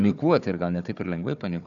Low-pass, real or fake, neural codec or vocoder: 7.2 kHz; fake; codec, 16 kHz, 4 kbps, FunCodec, trained on Chinese and English, 50 frames a second